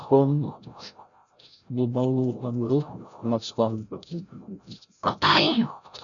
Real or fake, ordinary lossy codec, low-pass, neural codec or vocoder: fake; MP3, 96 kbps; 7.2 kHz; codec, 16 kHz, 0.5 kbps, FreqCodec, larger model